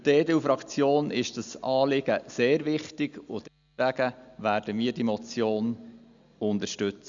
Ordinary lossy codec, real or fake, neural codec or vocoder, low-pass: Opus, 64 kbps; real; none; 7.2 kHz